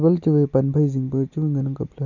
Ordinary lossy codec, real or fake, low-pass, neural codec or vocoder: none; real; 7.2 kHz; none